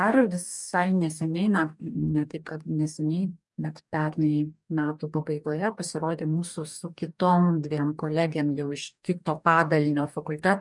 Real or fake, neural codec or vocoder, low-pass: fake; codec, 44.1 kHz, 2.6 kbps, DAC; 10.8 kHz